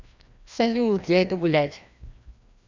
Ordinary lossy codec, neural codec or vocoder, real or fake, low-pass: MP3, 64 kbps; codec, 16 kHz, 1 kbps, FreqCodec, larger model; fake; 7.2 kHz